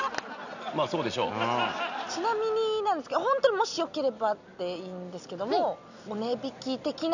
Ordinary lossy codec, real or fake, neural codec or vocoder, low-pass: none; real; none; 7.2 kHz